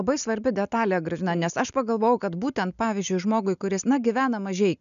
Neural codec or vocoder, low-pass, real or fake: none; 7.2 kHz; real